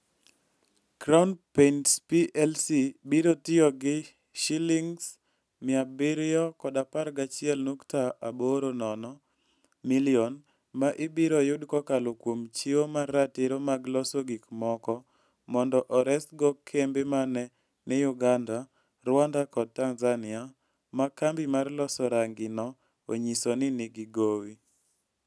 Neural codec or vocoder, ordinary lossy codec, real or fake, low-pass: none; none; real; none